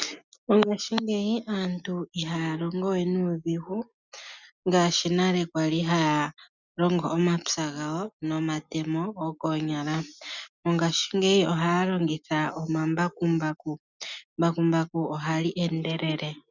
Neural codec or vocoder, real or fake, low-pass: none; real; 7.2 kHz